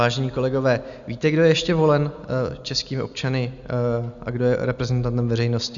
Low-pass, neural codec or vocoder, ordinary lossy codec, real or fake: 7.2 kHz; none; Opus, 64 kbps; real